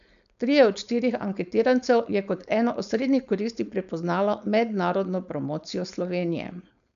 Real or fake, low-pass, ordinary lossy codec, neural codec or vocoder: fake; 7.2 kHz; none; codec, 16 kHz, 4.8 kbps, FACodec